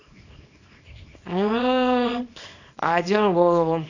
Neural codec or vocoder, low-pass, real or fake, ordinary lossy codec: codec, 24 kHz, 0.9 kbps, WavTokenizer, small release; 7.2 kHz; fake; none